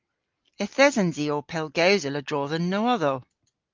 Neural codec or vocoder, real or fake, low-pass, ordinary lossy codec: none; real; 7.2 kHz; Opus, 32 kbps